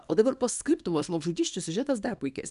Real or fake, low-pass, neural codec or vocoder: fake; 10.8 kHz; codec, 24 kHz, 0.9 kbps, WavTokenizer, small release